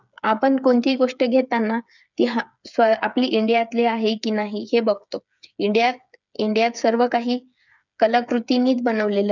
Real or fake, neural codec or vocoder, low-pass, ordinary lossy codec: fake; codec, 16 kHz, 8 kbps, FreqCodec, smaller model; 7.2 kHz; none